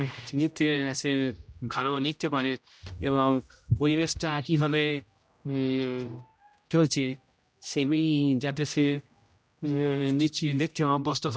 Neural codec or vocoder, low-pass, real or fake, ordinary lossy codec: codec, 16 kHz, 0.5 kbps, X-Codec, HuBERT features, trained on general audio; none; fake; none